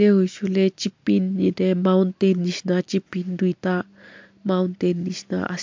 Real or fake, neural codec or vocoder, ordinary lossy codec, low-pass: real; none; MP3, 64 kbps; 7.2 kHz